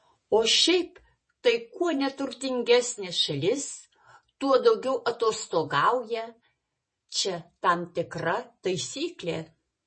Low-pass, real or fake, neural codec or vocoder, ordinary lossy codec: 9.9 kHz; fake; vocoder, 48 kHz, 128 mel bands, Vocos; MP3, 32 kbps